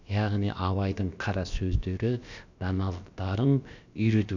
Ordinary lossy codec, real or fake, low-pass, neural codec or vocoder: none; fake; 7.2 kHz; codec, 16 kHz, about 1 kbps, DyCAST, with the encoder's durations